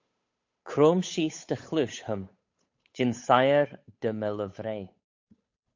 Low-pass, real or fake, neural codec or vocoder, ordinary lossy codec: 7.2 kHz; fake; codec, 16 kHz, 8 kbps, FunCodec, trained on Chinese and English, 25 frames a second; MP3, 48 kbps